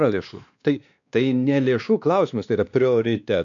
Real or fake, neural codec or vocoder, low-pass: fake; codec, 16 kHz, 2 kbps, X-Codec, WavLM features, trained on Multilingual LibriSpeech; 7.2 kHz